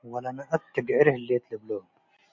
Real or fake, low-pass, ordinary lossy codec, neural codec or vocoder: real; 7.2 kHz; MP3, 48 kbps; none